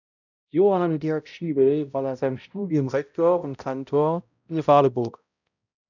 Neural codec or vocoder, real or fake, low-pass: codec, 16 kHz, 0.5 kbps, X-Codec, HuBERT features, trained on balanced general audio; fake; 7.2 kHz